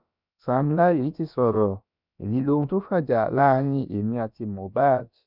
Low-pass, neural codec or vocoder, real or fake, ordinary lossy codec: 5.4 kHz; codec, 16 kHz, about 1 kbps, DyCAST, with the encoder's durations; fake; none